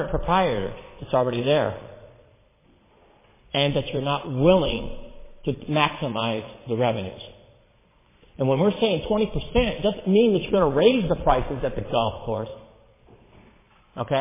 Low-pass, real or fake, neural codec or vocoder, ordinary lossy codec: 3.6 kHz; fake; vocoder, 22.05 kHz, 80 mel bands, Vocos; MP3, 16 kbps